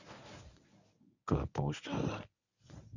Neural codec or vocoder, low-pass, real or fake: codec, 24 kHz, 0.9 kbps, WavTokenizer, medium speech release version 1; 7.2 kHz; fake